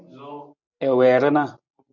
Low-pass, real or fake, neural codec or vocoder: 7.2 kHz; real; none